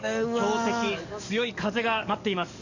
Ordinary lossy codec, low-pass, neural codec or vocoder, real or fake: none; 7.2 kHz; codec, 44.1 kHz, 7.8 kbps, DAC; fake